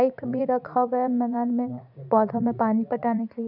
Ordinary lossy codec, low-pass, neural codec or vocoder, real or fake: none; 5.4 kHz; vocoder, 44.1 kHz, 80 mel bands, Vocos; fake